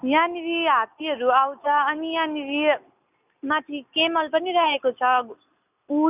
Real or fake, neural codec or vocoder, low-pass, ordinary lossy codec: real; none; 3.6 kHz; none